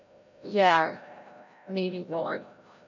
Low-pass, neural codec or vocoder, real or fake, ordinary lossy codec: 7.2 kHz; codec, 16 kHz, 0.5 kbps, FreqCodec, larger model; fake; none